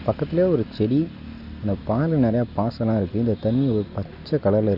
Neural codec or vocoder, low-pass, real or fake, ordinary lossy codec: none; 5.4 kHz; real; none